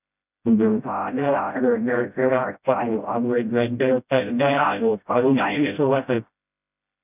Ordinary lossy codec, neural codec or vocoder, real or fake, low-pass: none; codec, 16 kHz, 0.5 kbps, FreqCodec, smaller model; fake; 3.6 kHz